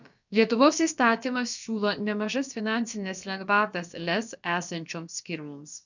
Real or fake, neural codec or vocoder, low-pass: fake; codec, 16 kHz, about 1 kbps, DyCAST, with the encoder's durations; 7.2 kHz